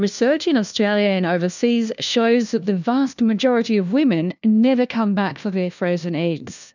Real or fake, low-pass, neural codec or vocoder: fake; 7.2 kHz; codec, 16 kHz, 1 kbps, FunCodec, trained on LibriTTS, 50 frames a second